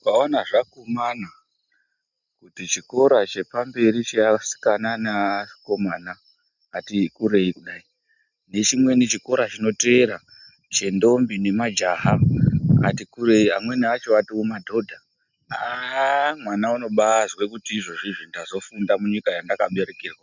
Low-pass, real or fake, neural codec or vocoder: 7.2 kHz; real; none